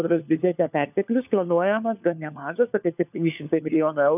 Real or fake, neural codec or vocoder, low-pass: fake; codec, 16 kHz, 4 kbps, FunCodec, trained on LibriTTS, 50 frames a second; 3.6 kHz